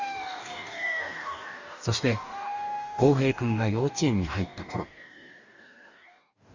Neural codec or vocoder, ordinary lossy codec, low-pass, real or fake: codec, 44.1 kHz, 2.6 kbps, DAC; Opus, 64 kbps; 7.2 kHz; fake